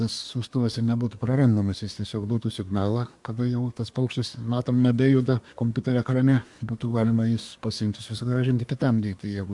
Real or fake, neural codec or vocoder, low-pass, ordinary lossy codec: fake; codec, 24 kHz, 1 kbps, SNAC; 10.8 kHz; MP3, 64 kbps